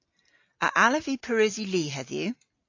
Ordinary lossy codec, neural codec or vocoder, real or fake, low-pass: AAC, 48 kbps; none; real; 7.2 kHz